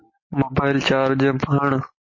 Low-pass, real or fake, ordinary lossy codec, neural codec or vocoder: 7.2 kHz; real; MP3, 32 kbps; none